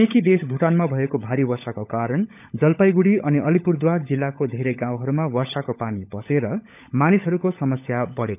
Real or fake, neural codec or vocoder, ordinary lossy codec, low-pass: fake; codec, 16 kHz, 16 kbps, FunCodec, trained on LibriTTS, 50 frames a second; none; 3.6 kHz